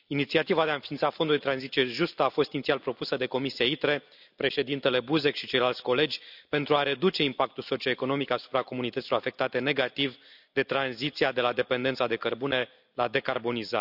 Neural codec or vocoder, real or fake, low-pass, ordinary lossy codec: none; real; 5.4 kHz; none